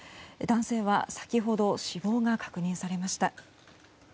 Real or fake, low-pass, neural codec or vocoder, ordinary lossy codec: real; none; none; none